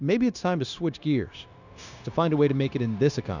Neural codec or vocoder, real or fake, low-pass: codec, 16 kHz, 0.9 kbps, LongCat-Audio-Codec; fake; 7.2 kHz